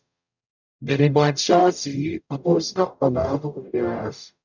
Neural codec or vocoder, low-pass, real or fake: codec, 44.1 kHz, 0.9 kbps, DAC; 7.2 kHz; fake